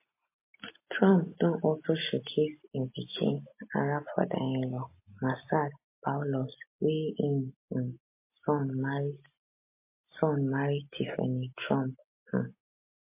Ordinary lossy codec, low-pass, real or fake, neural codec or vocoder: MP3, 24 kbps; 3.6 kHz; real; none